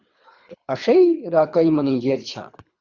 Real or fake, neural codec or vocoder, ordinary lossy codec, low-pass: fake; codec, 24 kHz, 3 kbps, HILCodec; Opus, 64 kbps; 7.2 kHz